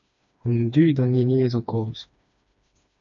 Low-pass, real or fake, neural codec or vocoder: 7.2 kHz; fake; codec, 16 kHz, 2 kbps, FreqCodec, smaller model